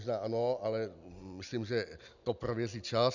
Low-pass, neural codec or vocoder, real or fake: 7.2 kHz; none; real